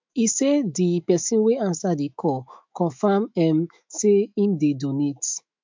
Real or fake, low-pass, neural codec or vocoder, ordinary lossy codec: fake; 7.2 kHz; autoencoder, 48 kHz, 128 numbers a frame, DAC-VAE, trained on Japanese speech; MP3, 64 kbps